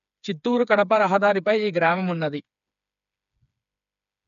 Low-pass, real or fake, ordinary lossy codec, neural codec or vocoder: 7.2 kHz; fake; none; codec, 16 kHz, 4 kbps, FreqCodec, smaller model